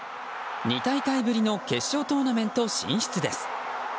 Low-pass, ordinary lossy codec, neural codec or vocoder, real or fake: none; none; none; real